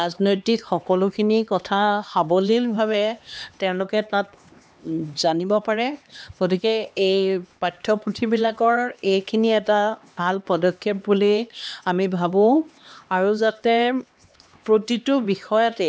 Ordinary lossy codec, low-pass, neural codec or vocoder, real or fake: none; none; codec, 16 kHz, 2 kbps, X-Codec, HuBERT features, trained on LibriSpeech; fake